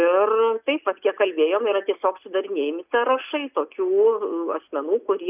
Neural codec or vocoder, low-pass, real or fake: none; 3.6 kHz; real